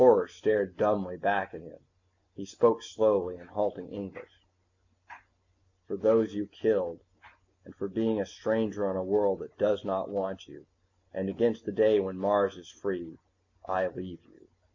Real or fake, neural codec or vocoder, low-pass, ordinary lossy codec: real; none; 7.2 kHz; MP3, 48 kbps